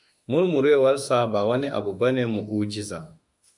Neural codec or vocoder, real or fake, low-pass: autoencoder, 48 kHz, 32 numbers a frame, DAC-VAE, trained on Japanese speech; fake; 10.8 kHz